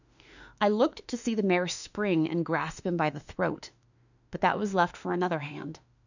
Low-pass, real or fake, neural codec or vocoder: 7.2 kHz; fake; autoencoder, 48 kHz, 32 numbers a frame, DAC-VAE, trained on Japanese speech